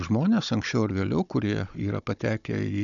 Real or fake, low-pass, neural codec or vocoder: fake; 7.2 kHz; codec, 16 kHz, 16 kbps, FunCodec, trained on Chinese and English, 50 frames a second